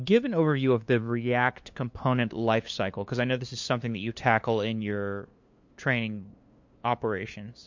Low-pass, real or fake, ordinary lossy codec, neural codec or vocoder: 7.2 kHz; fake; MP3, 48 kbps; codec, 16 kHz, 2 kbps, FunCodec, trained on LibriTTS, 25 frames a second